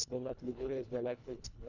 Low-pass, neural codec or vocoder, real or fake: 7.2 kHz; codec, 24 kHz, 1.5 kbps, HILCodec; fake